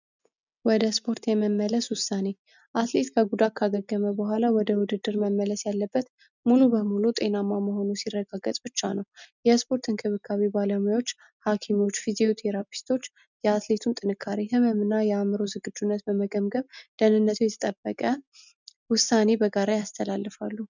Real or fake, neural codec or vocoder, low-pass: real; none; 7.2 kHz